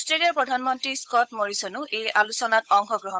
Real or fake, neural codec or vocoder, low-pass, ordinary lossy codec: fake; codec, 16 kHz, 8 kbps, FunCodec, trained on Chinese and English, 25 frames a second; none; none